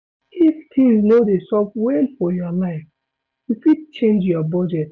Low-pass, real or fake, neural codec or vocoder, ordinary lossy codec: none; real; none; none